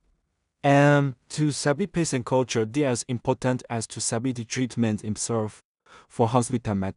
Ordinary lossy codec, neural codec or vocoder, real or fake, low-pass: none; codec, 16 kHz in and 24 kHz out, 0.4 kbps, LongCat-Audio-Codec, two codebook decoder; fake; 10.8 kHz